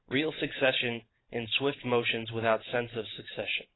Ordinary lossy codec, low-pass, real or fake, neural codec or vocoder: AAC, 16 kbps; 7.2 kHz; fake; vocoder, 44.1 kHz, 128 mel bands every 512 samples, BigVGAN v2